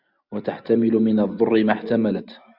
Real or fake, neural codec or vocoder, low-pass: real; none; 5.4 kHz